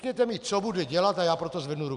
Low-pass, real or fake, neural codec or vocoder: 10.8 kHz; real; none